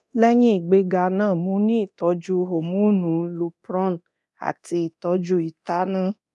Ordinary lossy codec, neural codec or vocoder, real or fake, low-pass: none; codec, 24 kHz, 0.9 kbps, DualCodec; fake; none